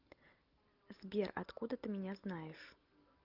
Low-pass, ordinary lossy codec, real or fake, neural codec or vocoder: 5.4 kHz; Opus, 24 kbps; real; none